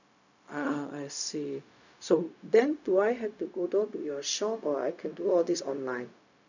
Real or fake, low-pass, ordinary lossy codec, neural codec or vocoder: fake; 7.2 kHz; none; codec, 16 kHz, 0.4 kbps, LongCat-Audio-Codec